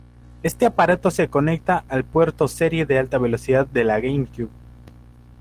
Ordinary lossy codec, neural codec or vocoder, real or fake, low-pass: Opus, 16 kbps; none; real; 14.4 kHz